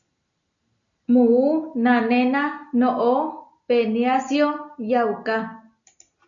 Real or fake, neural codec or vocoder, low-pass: real; none; 7.2 kHz